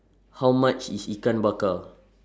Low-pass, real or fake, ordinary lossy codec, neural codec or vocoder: none; real; none; none